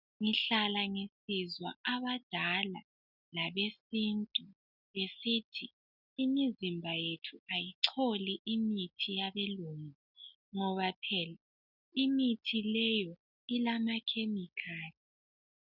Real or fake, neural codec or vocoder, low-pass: real; none; 5.4 kHz